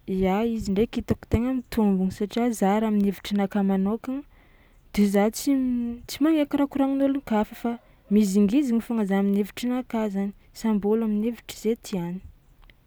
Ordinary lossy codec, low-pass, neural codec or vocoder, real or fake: none; none; none; real